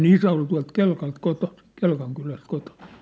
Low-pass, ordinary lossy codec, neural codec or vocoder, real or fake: none; none; none; real